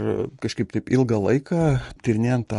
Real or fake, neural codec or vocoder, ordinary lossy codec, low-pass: real; none; MP3, 48 kbps; 14.4 kHz